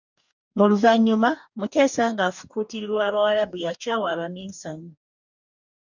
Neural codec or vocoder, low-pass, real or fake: codec, 44.1 kHz, 2.6 kbps, DAC; 7.2 kHz; fake